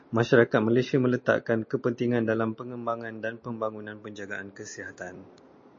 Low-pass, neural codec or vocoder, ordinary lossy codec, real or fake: 7.2 kHz; none; MP3, 32 kbps; real